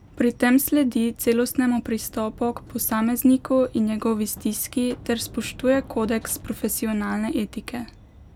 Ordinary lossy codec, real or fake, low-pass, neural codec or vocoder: none; real; 19.8 kHz; none